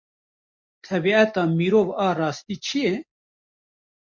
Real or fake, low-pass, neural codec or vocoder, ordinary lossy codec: real; 7.2 kHz; none; MP3, 48 kbps